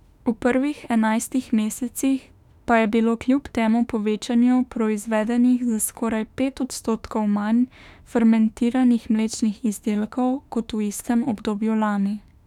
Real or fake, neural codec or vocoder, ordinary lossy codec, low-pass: fake; autoencoder, 48 kHz, 32 numbers a frame, DAC-VAE, trained on Japanese speech; none; 19.8 kHz